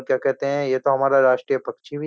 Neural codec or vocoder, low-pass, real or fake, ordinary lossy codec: none; none; real; none